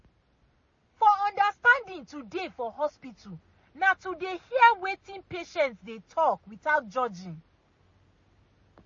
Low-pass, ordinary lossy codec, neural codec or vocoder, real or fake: 7.2 kHz; MP3, 32 kbps; none; real